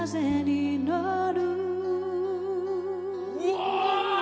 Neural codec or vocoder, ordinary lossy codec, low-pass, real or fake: none; none; none; real